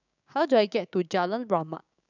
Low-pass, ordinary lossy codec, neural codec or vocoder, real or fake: 7.2 kHz; none; codec, 16 kHz, 6 kbps, DAC; fake